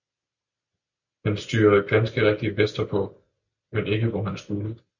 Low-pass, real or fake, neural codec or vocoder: 7.2 kHz; real; none